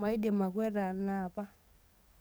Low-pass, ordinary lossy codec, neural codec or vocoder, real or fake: none; none; codec, 44.1 kHz, 7.8 kbps, DAC; fake